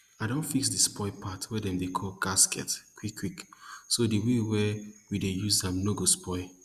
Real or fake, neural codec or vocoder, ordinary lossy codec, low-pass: real; none; Opus, 64 kbps; 14.4 kHz